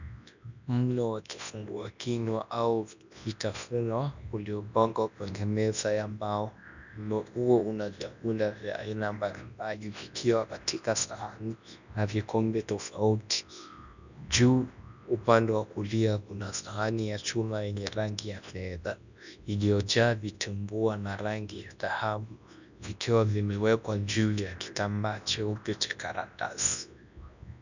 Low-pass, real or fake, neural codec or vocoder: 7.2 kHz; fake; codec, 24 kHz, 0.9 kbps, WavTokenizer, large speech release